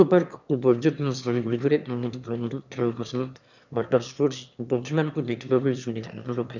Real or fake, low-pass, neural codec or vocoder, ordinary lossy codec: fake; 7.2 kHz; autoencoder, 22.05 kHz, a latent of 192 numbers a frame, VITS, trained on one speaker; none